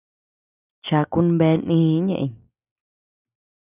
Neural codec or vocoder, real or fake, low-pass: none; real; 3.6 kHz